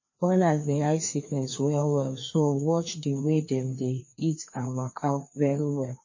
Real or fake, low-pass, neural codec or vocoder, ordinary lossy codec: fake; 7.2 kHz; codec, 16 kHz, 2 kbps, FreqCodec, larger model; MP3, 32 kbps